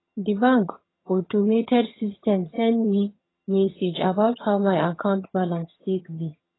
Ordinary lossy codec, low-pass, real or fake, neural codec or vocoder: AAC, 16 kbps; 7.2 kHz; fake; vocoder, 22.05 kHz, 80 mel bands, HiFi-GAN